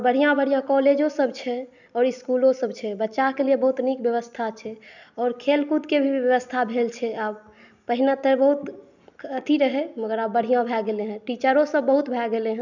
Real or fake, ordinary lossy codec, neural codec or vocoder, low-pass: real; none; none; 7.2 kHz